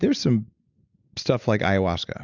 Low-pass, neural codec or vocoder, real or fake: 7.2 kHz; none; real